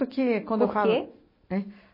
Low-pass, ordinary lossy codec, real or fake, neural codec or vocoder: 5.4 kHz; MP3, 24 kbps; real; none